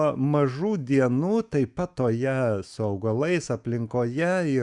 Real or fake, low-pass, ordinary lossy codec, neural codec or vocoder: fake; 10.8 kHz; Opus, 64 kbps; autoencoder, 48 kHz, 128 numbers a frame, DAC-VAE, trained on Japanese speech